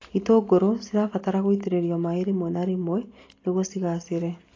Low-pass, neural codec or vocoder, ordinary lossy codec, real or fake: 7.2 kHz; none; AAC, 32 kbps; real